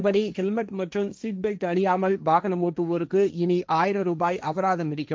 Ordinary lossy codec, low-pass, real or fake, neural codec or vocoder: none; none; fake; codec, 16 kHz, 1.1 kbps, Voila-Tokenizer